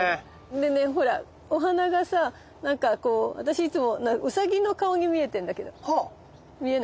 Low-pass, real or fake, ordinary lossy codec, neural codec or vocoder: none; real; none; none